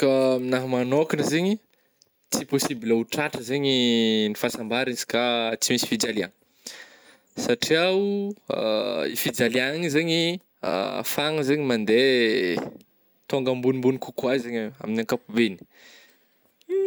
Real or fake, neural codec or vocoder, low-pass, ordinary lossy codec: real; none; none; none